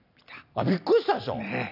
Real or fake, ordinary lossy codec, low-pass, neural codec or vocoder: real; none; 5.4 kHz; none